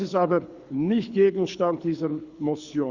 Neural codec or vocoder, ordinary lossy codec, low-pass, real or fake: codec, 24 kHz, 6 kbps, HILCodec; Opus, 64 kbps; 7.2 kHz; fake